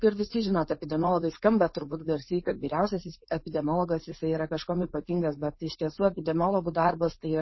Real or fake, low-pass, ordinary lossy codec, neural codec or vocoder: fake; 7.2 kHz; MP3, 24 kbps; codec, 16 kHz, 4.8 kbps, FACodec